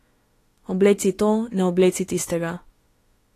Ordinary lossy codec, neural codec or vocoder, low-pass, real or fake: AAC, 48 kbps; autoencoder, 48 kHz, 32 numbers a frame, DAC-VAE, trained on Japanese speech; 14.4 kHz; fake